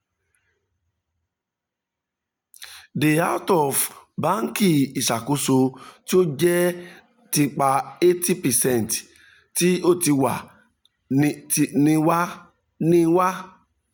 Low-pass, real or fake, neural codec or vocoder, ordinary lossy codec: none; real; none; none